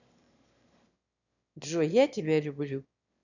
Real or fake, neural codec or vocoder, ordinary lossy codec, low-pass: fake; autoencoder, 22.05 kHz, a latent of 192 numbers a frame, VITS, trained on one speaker; none; 7.2 kHz